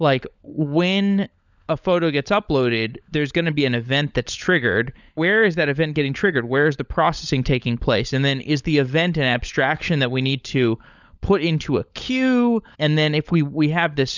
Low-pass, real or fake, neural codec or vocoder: 7.2 kHz; fake; codec, 16 kHz, 16 kbps, FunCodec, trained on LibriTTS, 50 frames a second